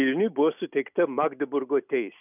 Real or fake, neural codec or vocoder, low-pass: real; none; 3.6 kHz